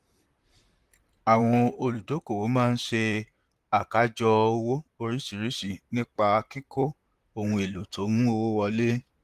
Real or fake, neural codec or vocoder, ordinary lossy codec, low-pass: fake; vocoder, 44.1 kHz, 128 mel bands, Pupu-Vocoder; Opus, 24 kbps; 14.4 kHz